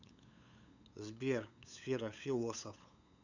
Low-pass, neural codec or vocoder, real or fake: 7.2 kHz; codec, 16 kHz, 8 kbps, FunCodec, trained on LibriTTS, 25 frames a second; fake